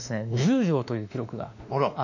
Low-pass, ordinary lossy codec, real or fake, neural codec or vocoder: 7.2 kHz; none; fake; autoencoder, 48 kHz, 32 numbers a frame, DAC-VAE, trained on Japanese speech